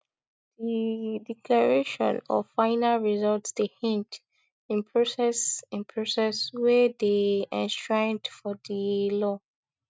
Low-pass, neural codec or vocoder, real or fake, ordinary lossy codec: none; none; real; none